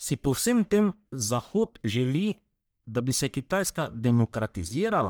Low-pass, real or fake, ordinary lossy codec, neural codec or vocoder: none; fake; none; codec, 44.1 kHz, 1.7 kbps, Pupu-Codec